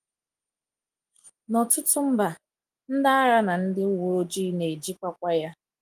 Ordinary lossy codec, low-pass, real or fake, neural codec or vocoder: Opus, 32 kbps; 14.4 kHz; real; none